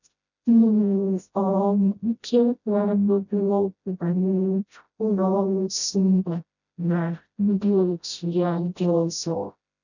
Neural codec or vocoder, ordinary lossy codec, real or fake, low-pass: codec, 16 kHz, 0.5 kbps, FreqCodec, smaller model; none; fake; 7.2 kHz